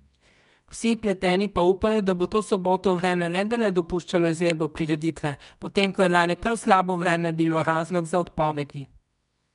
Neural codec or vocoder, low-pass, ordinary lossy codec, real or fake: codec, 24 kHz, 0.9 kbps, WavTokenizer, medium music audio release; 10.8 kHz; none; fake